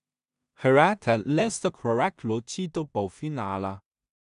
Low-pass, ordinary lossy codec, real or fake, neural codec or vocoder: 10.8 kHz; none; fake; codec, 16 kHz in and 24 kHz out, 0.4 kbps, LongCat-Audio-Codec, two codebook decoder